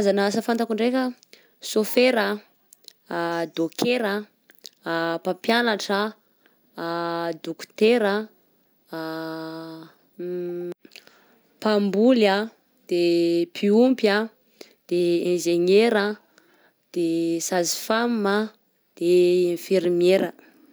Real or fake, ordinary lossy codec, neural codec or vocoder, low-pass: real; none; none; none